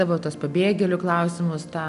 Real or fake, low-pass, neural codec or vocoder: real; 10.8 kHz; none